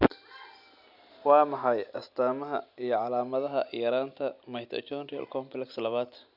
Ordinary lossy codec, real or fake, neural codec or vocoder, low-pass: none; real; none; 5.4 kHz